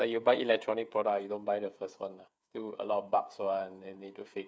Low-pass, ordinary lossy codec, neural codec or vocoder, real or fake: none; none; codec, 16 kHz, 8 kbps, FreqCodec, smaller model; fake